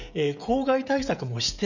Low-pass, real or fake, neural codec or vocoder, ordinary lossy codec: 7.2 kHz; fake; codec, 16 kHz, 16 kbps, FreqCodec, smaller model; none